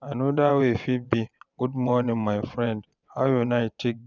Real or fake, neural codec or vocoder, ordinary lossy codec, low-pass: fake; vocoder, 22.05 kHz, 80 mel bands, WaveNeXt; none; 7.2 kHz